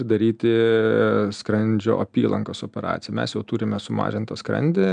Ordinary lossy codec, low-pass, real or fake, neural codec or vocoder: MP3, 96 kbps; 9.9 kHz; real; none